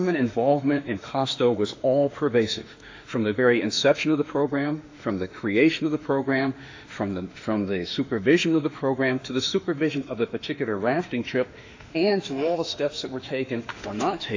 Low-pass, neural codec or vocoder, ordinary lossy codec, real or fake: 7.2 kHz; autoencoder, 48 kHz, 32 numbers a frame, DAC-VAE, trained on Japanese speech; AAC, 48 kbps; fake